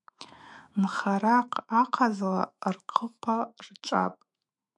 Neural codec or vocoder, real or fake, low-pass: autoencoder, 48 kHz, 128 numbers a frame, DAC-VAE, trained on Japanese speech; fake; 10.8 kHz